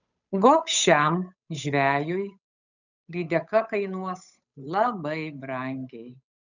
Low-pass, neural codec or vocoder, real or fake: 7.2 kHz; codec, 16 kHz, 8 kbps, FunCodec, trained on Chinese and English, 25 frames a second; fake